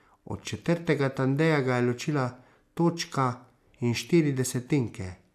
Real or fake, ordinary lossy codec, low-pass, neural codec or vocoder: real; none; 14.4 kHz; none